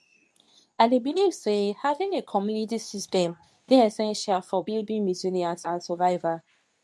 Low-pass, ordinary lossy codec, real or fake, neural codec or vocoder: none; none; fake; codec, 24 kHz, 0.9 kbps, WavTokenizer, medium speech release version 2